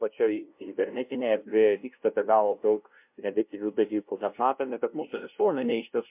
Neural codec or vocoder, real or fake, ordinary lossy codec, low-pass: codec, 16 kHz, 0.5 kbps, FunCodec, trained on LibriTTS, 25 frames a second; fake; MP3, 32 kbps; 3.6 kHz